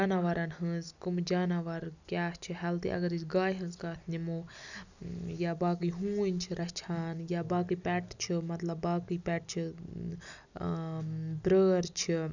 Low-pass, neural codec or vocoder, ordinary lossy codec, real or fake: 7.2 kHz; none; none; real